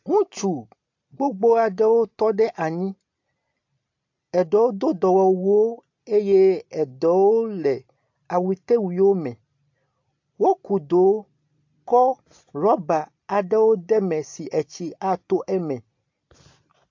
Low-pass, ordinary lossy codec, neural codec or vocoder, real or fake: 7.2 kHz; AAC, 48 kbps; none; real